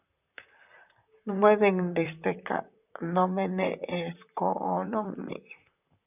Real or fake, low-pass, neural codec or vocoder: fake; 3.6 kHz; vocoder, 44.1 kHz, 128 mel bands, Pupu-Vocoder